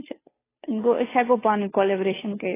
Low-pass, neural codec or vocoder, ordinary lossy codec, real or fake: 3.6 kHz; codec, 16 kHz, 2 kbps, FunCodec, trained on Chinese and English, 25 frames a second; AAC, 16 kbps; fake